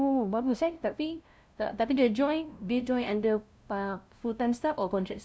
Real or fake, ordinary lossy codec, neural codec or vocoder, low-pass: fake; none; codec, 16 kHz, 0.5 kbps, FunCodec, trained on LibriTTS, 25 frames a second; none